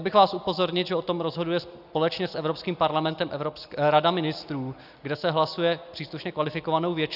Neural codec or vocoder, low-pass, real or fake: none; 5.4 kHz; real